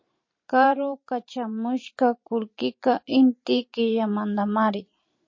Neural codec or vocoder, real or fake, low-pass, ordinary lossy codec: vocoder, 44.1 kHz, 128 mel bands every 512 samples, BigVGAN v2; fake; 7.2 kHz; MP3, 32 kbps